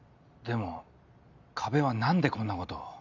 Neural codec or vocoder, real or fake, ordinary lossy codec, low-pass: none; real; none; 7.2 kHz